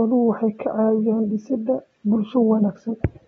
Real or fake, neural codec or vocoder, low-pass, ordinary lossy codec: real; none; 19.8 kHz; AAC, 24 kbps